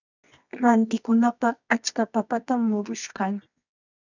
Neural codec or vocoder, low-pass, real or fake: codec, 24 kHz, 0.9 kbps, WavTokenizer, medium music audio release; 7.2 kHz; fake